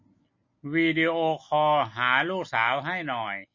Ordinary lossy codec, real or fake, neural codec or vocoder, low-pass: MP3, 32 kbps; real; none; 7.2 kHz